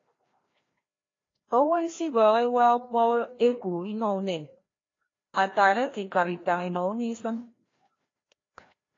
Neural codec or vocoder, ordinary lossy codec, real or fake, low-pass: codec, 16 kHz, 1 kbps, FreqCodec, larger model; AAC, 32 kbps; fake; 7.2 kHz